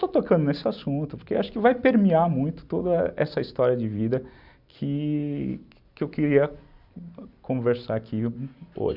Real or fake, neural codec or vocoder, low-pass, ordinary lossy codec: real; none; 5.4 kHz; none